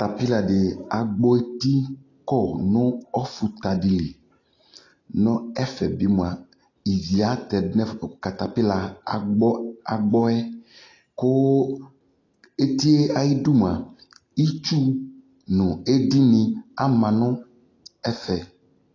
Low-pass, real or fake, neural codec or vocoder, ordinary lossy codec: 7.2 kHz; real; none; AAC, 32 kbps